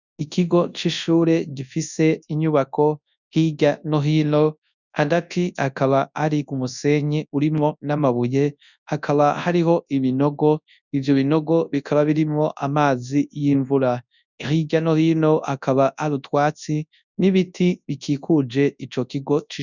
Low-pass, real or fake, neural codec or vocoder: 7.2 kHz; fake; codec, 24 kHz, 0.9 kbps, WavTokenizer, large speech release